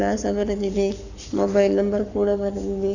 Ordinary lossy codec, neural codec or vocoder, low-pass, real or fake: none; codec, 44.1 kHz, 7.8 kbps, Pupu-Codec; 7.2 kHz; fake